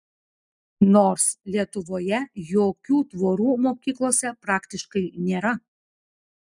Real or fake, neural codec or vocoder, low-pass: real; none; 10.8 kHz